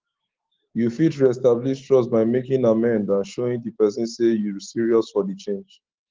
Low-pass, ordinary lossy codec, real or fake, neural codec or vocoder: 7.2 kHz; Opus, 16 kbps; fake; autoencoder, 48 kHz, 128 numbers a frame, DAC-VAE, trained on Japanese speech